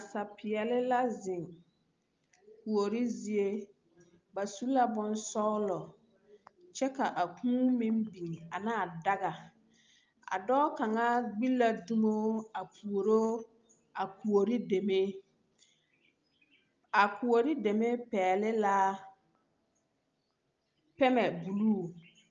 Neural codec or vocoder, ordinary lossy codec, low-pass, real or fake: none; Opus, 24 kbps; 7.2 kHz; real